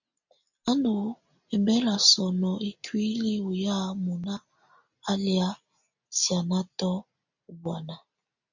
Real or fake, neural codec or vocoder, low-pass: real; none; 7.2 kHz